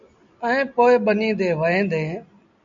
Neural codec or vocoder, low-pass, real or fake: none; 7.2 kHz; real